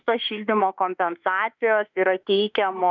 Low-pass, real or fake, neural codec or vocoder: 7.2 kHz; fake; autoencoder, 48 kHz, 32 numbers a frame, DAC-VAE, trained on Japanese speech